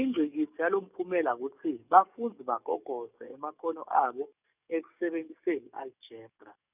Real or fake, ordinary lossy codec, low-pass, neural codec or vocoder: fake; none; 3.6 kHz; codec, 44.1 kHz, 7.8 kbps, DAC